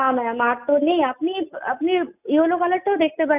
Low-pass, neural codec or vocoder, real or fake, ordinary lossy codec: 3.6 kHz; none; real; none